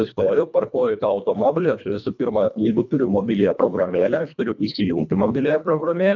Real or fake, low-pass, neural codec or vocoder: fake; 7.2 kHz; codec, 24 kHz, 1.5 kbps, HILCodec